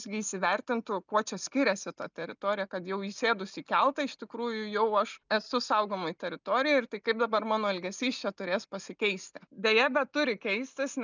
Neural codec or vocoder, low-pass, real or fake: none; 7.2 kHz; real